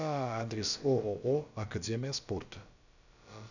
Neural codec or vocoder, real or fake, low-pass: codec, 16 kHz, about 1 kbps, DyCAST, with the encoder's durations; fake; 7.2 kHz